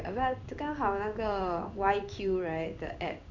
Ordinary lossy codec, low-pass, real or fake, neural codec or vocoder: none; 7.2 kHz; fake; codec, 16 kHz in and 24 kHz out, 1 kbps, XY-Tokenizer